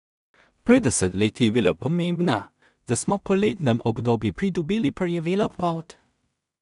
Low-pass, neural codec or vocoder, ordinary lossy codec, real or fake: 10.8 kHz; codec, 16 kHz in and 24 kHz out, 0.4 kbps, LongCat-Audio-Codec, two codebook decoder; none; fake